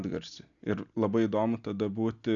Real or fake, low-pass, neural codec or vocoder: real; 7.2 kHz; none